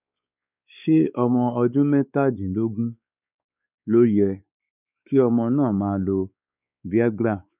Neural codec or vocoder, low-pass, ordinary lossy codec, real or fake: codec, 16 kHz, 4 kbps, X-Codec, WavLM features, trained on Multilingual LibriSpeech; 3.6 kHz; none; fake